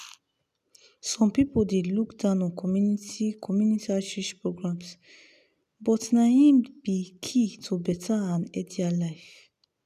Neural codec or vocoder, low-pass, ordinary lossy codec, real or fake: none; 14.4 kHz; none; real